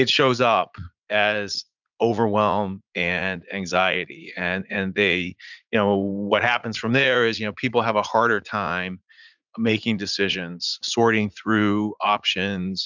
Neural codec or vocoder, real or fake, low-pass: vocoder, 44.1 kHz, 80 mel bands, Vocos; fake; 7.2 kHz